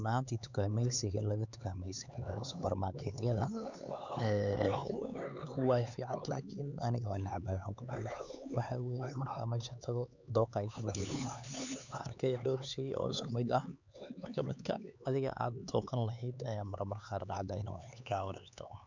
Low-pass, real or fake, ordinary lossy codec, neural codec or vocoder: 7.2 kHz; fake; none; codec, 16 kHz, 4 kbps, X-Codec, HuBERT features, trained on LibriSpeech